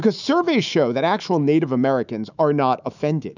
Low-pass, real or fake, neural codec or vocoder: 7.2 kHz; fake; autoencoder, 48 kHz, 128 numbers a frame, DAC-VAE, trained on Japanese speech